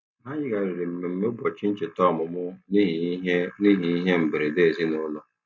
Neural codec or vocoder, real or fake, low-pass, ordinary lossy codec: none; real; none; none